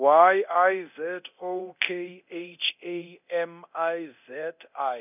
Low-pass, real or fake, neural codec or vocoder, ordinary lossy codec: 3.6 kHz; fake; codec, 24 kHz, 0.9 kbps, DualCodec; none